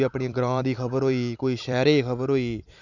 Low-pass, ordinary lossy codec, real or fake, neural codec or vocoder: 7.2 kHz; none; fake; vocoder, 44.1 kHz, 128 mel bands every 256 samples, BigVGAN v2